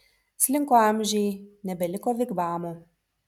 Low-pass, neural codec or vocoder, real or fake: 19.8 kHz; none; real